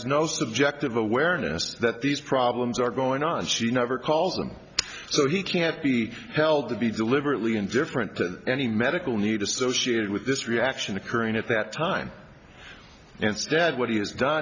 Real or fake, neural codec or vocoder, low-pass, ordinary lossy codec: real; none; 7.2 kHz; Opus, 64 kbps